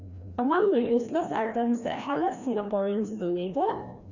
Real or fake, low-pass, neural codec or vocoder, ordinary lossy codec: fake; 7.2 kHz; codec, 16 kHz, 1 kbps, FreqCodec, larger model; none